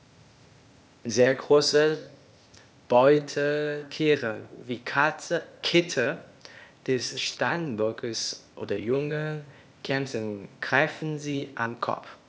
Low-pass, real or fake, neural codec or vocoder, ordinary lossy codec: none; fake; codec, 16 kHz, 0.8 kbps, ZipCodec; none